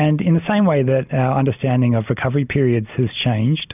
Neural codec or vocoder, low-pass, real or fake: none; 3.6 kHz; real